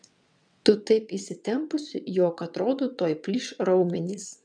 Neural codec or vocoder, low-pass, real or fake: vocoder, 22.05 kHz, 80 mel bands, WaveNeXt; 9.9 kHz; fake